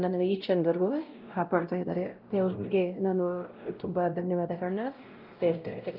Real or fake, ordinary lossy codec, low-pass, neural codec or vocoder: fake; Opus, 32 kbps; 5.4 kHz; codec, 16 kHz, 0.5 kbps, X-Codec, WavLM features, trained on Multilingual LibriSpeech